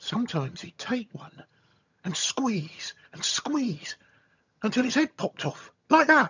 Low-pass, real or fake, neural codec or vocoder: 7.2 kHz; fake; vocoder, 22.05 kHz, 80 mel bands, HiFi-GAN